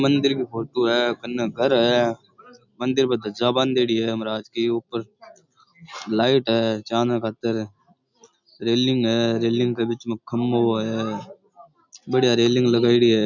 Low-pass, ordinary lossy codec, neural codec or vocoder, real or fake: 7.2 kHz; none; none; real